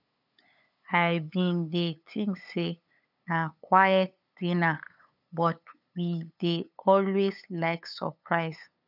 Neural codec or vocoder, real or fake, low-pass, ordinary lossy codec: codec, 16 kHz, 8 kbps, FunCodec, trained on LibriTTS, 25 frames a second; fake; 5.4 kHz; none